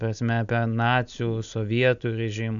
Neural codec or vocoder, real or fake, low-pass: none; real; 7.2 kHz